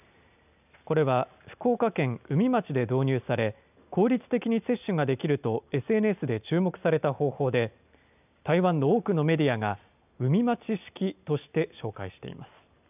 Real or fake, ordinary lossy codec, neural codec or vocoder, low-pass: real; none; none; 3.6 kHz